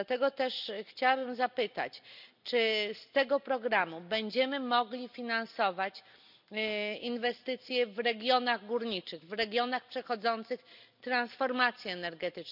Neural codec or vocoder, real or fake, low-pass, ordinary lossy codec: none; real; 5.4 kHz; none